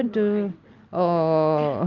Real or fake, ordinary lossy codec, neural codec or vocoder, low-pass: real; Opus, 24 kbps; none; 7.2 kHz